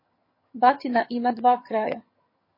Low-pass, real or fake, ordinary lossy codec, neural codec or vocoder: 5.4 kHz; fake; MP3, 24 kbps; vocoder, 22.05 kHz, 80 mel bands, HiFi-GAN